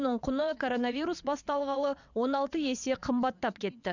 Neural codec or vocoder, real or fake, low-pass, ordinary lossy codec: vocoder, 22.05 kHz, 80 mel bands, WaveNeXt; fake; 7.2 kHz; none